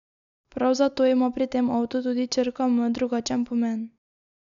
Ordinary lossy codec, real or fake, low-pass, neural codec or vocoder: none; real; 7.2 kHz; none